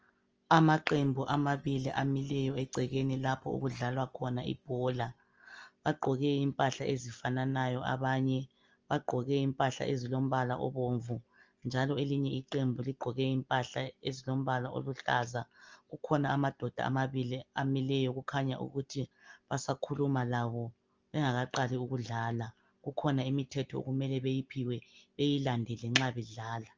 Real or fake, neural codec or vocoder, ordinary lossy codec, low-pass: real; none; Opus, 24 kbps; 7.2 kHz